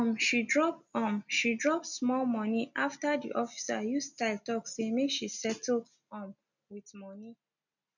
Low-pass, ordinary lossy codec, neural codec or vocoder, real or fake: 7.2 kHz; none; none; real